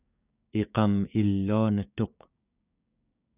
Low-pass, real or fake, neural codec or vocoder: 3.6 kHz; fake; codec, 16 kHz, 6 kbps, DAC